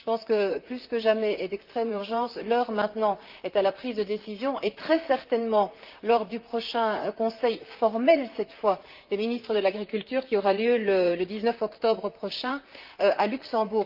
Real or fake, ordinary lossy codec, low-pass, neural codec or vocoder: fake; Opus, 32 kbps; 5.4 kHz; vocoder, 44.1 kHz, 128 mel bands, Pupu-Vocoder